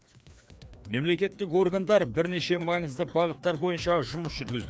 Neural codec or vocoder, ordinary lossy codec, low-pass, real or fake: codec, 16 kHz, 2 kbps, FreqCodec, larger model; none; none; fake